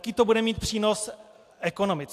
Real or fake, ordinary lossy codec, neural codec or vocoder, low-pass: real; AAC, 64 kbps; none; 14.4 kHz